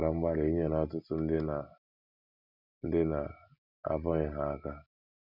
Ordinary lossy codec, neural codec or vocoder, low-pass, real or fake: none; none; 5.4 kHz; real